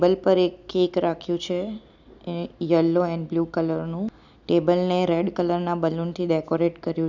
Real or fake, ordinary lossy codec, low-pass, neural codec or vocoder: real; none; 7.2 kHz; none